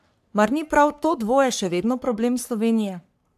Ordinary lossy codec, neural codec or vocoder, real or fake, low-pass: AAC, 96 kbps; codec, 44.1 kHz, 3.4 kbps, Pupu-Codec; fake; 14.4 kHz